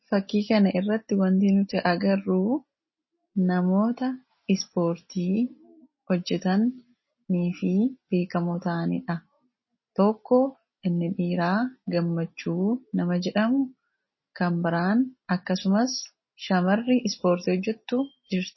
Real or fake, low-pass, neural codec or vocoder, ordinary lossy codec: real; 7.2 kHz; none; MP3, 24 kbps